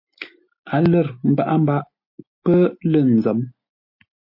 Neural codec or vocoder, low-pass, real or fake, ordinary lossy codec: none; 5.4 kHz; real; MP3, 32 kbps